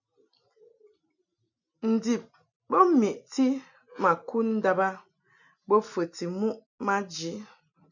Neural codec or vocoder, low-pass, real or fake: none; 7.2 kHz; real